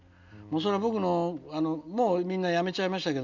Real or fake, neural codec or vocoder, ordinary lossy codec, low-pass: real; none; none; 7.2 kHz